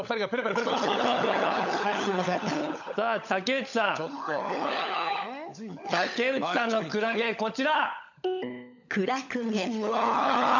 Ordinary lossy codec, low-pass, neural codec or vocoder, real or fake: none; 7.2 kHz; codec, 16 kHz, 16 kbps, FunCodec, trained on LibriTTS, 50 frames a second; fake